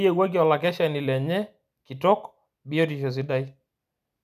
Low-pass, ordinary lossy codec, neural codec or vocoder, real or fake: 14.4 kHz; none; none; real